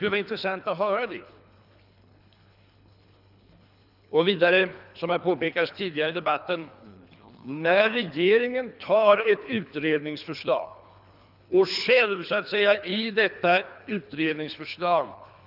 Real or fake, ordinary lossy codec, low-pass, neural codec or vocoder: fake; none; 5.4 kHz; codec, 24 kHz, 3 kbps, HILCodec